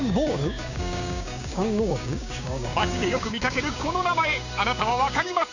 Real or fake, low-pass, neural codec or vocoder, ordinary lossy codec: fake; 7.2 kHz; codec, 16 kHz, 6 kbps, DAC; none